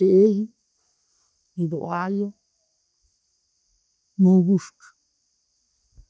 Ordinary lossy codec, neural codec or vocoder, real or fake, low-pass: none; none; real; none